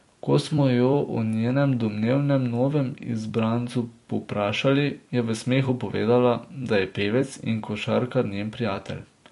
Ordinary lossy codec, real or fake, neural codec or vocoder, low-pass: MP3, 48 kbps; fake; vocoder, 44.1 kHz, 128 mel bands every 256 samples, BigVGAN v2; 14.4 kHz